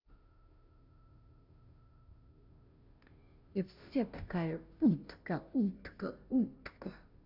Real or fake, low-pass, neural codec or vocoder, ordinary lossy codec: fake; 5.4 kHz; codec, 16 kHz, 0.5 kbps, FunCodec, trained on Chinese and English, 25 frames a second; none